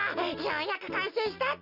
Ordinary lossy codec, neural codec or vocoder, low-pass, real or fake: none; none; 5.4 kHz; real